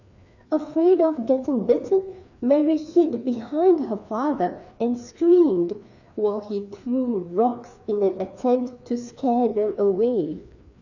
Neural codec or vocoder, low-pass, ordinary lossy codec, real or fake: codec, 16 kHz, 2 kbps, FreqCodec, larger model; 7.2 kHz; none; fake